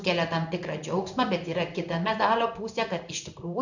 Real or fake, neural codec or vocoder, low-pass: fake; codec, 16 kHz in and 24 kHz out, 1 kbps, XY-Tokenizer; 7.2 kHz